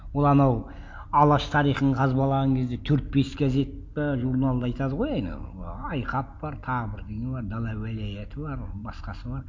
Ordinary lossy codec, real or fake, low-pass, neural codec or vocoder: none; real; none; none